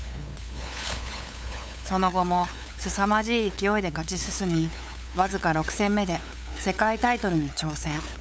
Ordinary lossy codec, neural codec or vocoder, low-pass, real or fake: none; codec, 16 kHz, 8 kbps, FunCodec, trained on LibriTTS, 25 frames a second; none; fake